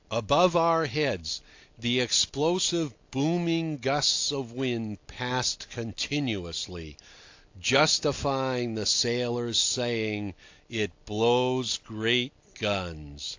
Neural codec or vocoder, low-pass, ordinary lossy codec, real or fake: none; 7.2 kHz; AAC, 48 kbps; real